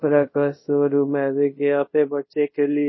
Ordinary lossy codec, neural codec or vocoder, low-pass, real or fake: MP3, 24 kbps; codec, 24 kHz, 0.5 kbps, DualCodec; 7.2 kHz; fake